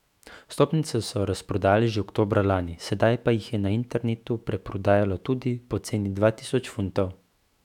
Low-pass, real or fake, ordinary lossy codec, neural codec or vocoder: 19.8 kHz; fake; none; autoencoder, 48 kHz, 128 numbers a frame, DAC-VAE, trained on Japanese speech